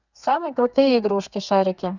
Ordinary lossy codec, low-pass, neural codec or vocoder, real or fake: none; 7.2 kHz; codec, 32 kHz, 1.9 kbps, SNAC; fake